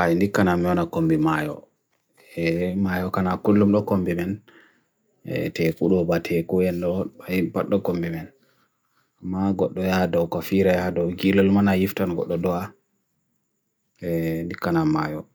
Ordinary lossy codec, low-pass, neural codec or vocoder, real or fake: none; none; none; real